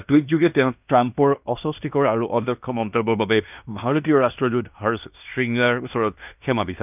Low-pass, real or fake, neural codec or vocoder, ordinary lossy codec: 3.6 kHz; fake; codec, 16 kHz in and 24 kHz out, 0.9 kbps, LongCat-Audio-Codec, fine tuned four codebook decoder; none